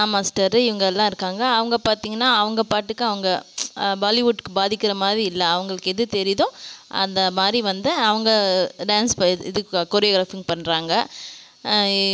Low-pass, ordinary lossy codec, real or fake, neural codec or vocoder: none; none; real; none